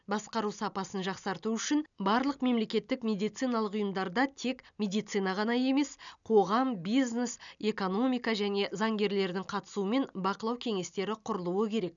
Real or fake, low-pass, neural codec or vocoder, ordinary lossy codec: real; 7.2 kHz; none; none